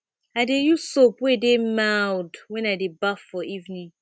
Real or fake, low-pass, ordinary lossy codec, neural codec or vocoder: real; none; none; none